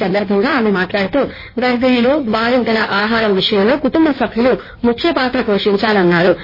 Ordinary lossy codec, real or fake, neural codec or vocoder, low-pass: MP3, 24 kbps; fake; codec, 16 kHz in and 24 kHz out, 1.1 kbps, FireRedTTS-2 codec; 5.4 kHz